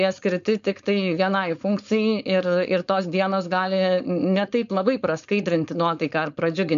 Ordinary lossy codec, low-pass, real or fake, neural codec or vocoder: MP3, 64 kbps; 7.2 kHz; fake; codec, 16 kHz, 4.8 kbps, FACodec